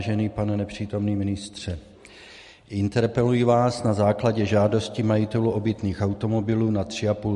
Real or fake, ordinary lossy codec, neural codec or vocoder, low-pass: real; MP3, 48 kbps; none; 14.4 kHz